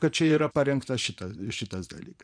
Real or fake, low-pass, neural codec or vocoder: fake; 9.9 kHz; vocoder, 24 kHz, 100 mel bands, Vocos